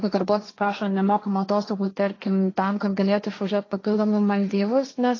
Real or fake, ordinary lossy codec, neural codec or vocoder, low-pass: fake; AAC, 32 kbps; codec, 16 kHz, 1.1 kbps, Voila-Tokenizer; 7.2 kHz